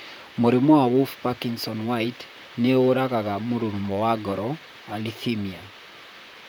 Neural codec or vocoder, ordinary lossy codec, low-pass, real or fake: vocoder, 44.1 kHz, 128 mel bands, Pupu-Vocoder; none; none; fake